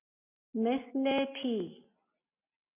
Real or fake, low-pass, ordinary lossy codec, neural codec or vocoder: real; 3.6 kHz; MP3, 24 kbps; none